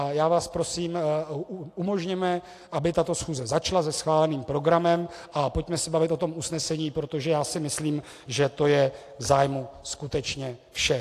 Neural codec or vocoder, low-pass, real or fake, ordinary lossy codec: none; 14.4 kHz; real; AAC, 64 kbps